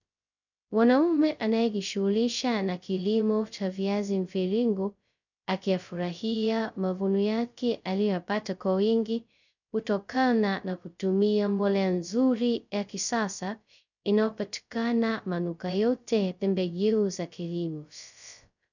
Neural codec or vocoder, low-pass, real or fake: codec, 16 kHz, 0.2 kbps, FocalCodec; 7.2 kHz; fake